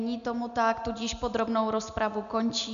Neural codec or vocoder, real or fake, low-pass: none; real; 7.2 kHz